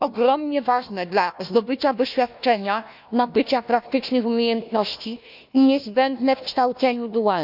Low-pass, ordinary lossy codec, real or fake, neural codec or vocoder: 5.4 kHz; none; fake; codec, 16 kHz, 1 kbps, FunCodec, trained on Chinese and English, 50 frames a second